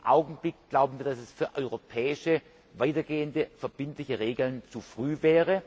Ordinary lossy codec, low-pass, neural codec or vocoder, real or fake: none; none; none; real